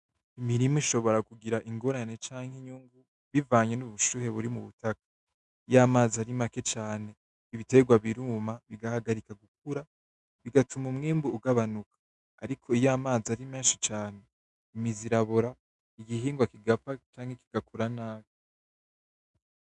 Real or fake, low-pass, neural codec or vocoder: fake; 10.8 kHz; autoencoder, 48 kHz, 128 numbers a frame, DAC-VAE, trained on Japanese speech